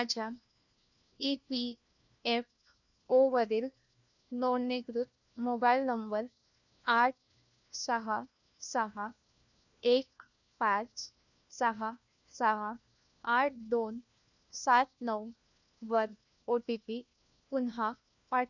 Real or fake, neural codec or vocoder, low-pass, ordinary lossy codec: fake; codec, 16 kHz, 1 kbps, FunCodec, trained on LibriTTS, 50 frames a second; 7.2 kHz; none